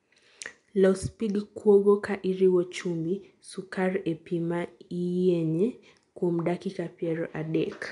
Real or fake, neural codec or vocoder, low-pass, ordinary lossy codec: real; none; 10.8 kHz; MP3, 64 kbps